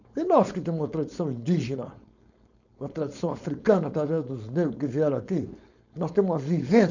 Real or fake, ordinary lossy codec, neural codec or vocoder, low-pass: fake; none; codec, 16 kHz, 4.8 kbps, FACodec; 7.2 kHz